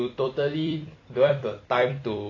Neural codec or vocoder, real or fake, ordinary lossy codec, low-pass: vocoder, 44.1 kHz, 128 mel bands every 256 samples, BigVGAN v2; fake; AAC, 32 kbps; 7.2 kHz